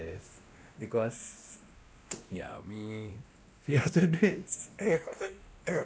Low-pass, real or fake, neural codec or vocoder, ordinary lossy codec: none; fake; codec, 16 kHz, 1 kbps, X-Codec, WavLM features, trained on Multilingual LibriSpeech; none